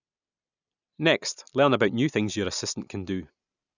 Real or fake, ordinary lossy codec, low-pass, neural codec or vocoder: real; none; 7.2 kHz; none